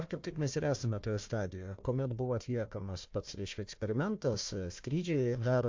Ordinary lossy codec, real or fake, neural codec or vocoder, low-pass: MP3, 48 kbps; fake; codec, 16 kHz, 1 kbps, FunCodec, trained on Chinese and English, 50 frames a second; 7.2 kHz